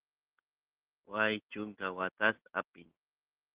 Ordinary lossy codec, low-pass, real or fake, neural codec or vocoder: Opus, 16 kbps; 3.6 kHz; real; none